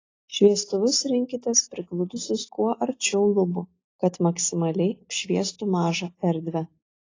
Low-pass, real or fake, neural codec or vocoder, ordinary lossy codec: 7.2 kHz; real; none; AAC, 32 kbps